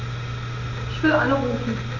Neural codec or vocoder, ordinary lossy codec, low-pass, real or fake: none; none; 7.2 kHz; real